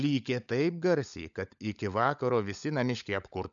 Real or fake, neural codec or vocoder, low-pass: fake; codec, 16 kHz, 16 kbps, FunCodec, trained on LibriTTS, 50 frames a second; 7.2 kHz